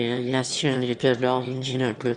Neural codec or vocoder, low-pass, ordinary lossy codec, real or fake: autoencoder, 22.05 kHz, a latent of 192 numbers a frame, VITS, trained on one speaker; 9.9 kHz; Opus, 64 kbps; fake